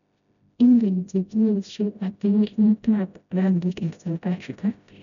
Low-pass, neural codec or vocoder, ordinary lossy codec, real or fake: 7.2 kHz; codec, 16 kHz, 0.5 kbps, FreqCodec, smaller model; none; fake